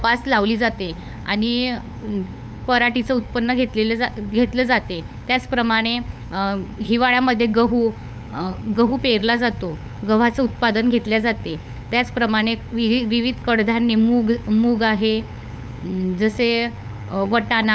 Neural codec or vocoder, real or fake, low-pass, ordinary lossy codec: codec, 16 kHz, 8 kbps, FunCodec, trained on LibriTTS, 25 frames a second; fake; none; none